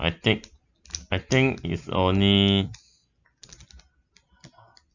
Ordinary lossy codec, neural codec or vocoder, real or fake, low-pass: none; none; real; 7.2 kHz